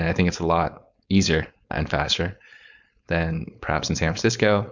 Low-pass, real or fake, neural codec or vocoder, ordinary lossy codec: 7.2 kHz; fake; codec, 16 kHz, 4.8 kbps, FACodec; Opus, 64 kbps